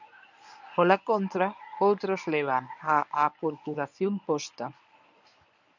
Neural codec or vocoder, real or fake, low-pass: codec, 24 kHz, 0.9 kbps, WavTokenizer, medium speech release version 1; fake; 7.2 kHz